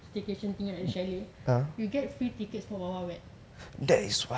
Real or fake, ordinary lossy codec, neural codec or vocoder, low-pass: real; none; none; none